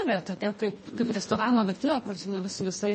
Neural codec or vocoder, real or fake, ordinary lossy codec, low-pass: codec, 24 kHz, 1.5 kbps, HILCodec; fake; MP3, 32 kbps; 10.8 kHz